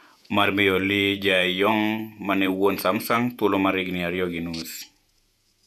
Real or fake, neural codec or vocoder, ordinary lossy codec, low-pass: fake; vocoder, 48 kHz, 128 mel bands, Vocos; none; 14.4 kHz